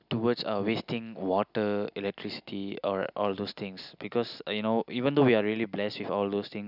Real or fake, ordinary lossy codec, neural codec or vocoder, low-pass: real; none; none; 5.4 kHz